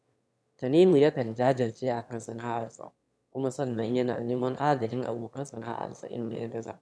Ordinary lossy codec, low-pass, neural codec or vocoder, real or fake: none; none; autoencoder, 22.05 kHz, a latent of 192 numbers a frame, VITS, trained on one speaker; fake